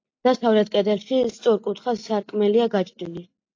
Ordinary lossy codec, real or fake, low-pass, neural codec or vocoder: AAC, 48 kbps; real; 7.2 kHz; none